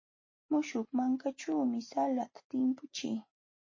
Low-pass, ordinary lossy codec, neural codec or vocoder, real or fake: 7.2 kHz; MP3, 32 kbps; none; real